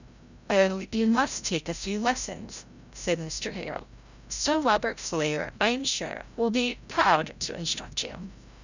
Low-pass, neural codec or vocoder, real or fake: 7.2 kHz; codec, 16 kHz, 0.5 kbps, FreqCodec, larger model; fake